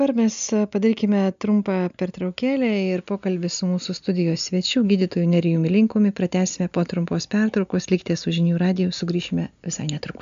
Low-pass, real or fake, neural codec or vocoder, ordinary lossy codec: 7.2 kHz; real; none; MP3, 64 kbps